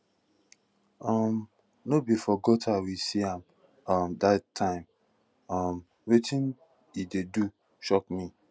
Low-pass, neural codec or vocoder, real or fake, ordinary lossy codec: none; none; real; none